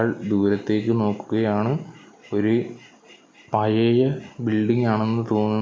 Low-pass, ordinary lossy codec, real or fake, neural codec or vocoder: none; none; real; none